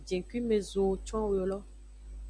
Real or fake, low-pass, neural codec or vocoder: real; 9.9 kHz; none